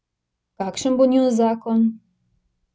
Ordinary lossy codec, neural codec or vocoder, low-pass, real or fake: none; none; none; real